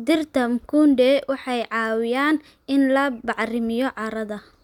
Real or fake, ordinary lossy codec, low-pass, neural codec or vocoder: real; none; 19.8 kHz; none